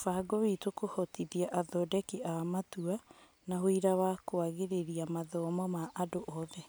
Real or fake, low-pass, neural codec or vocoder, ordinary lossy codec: real; none; none; none